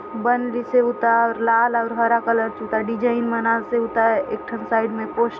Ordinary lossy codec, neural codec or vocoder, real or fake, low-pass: none; none; real; none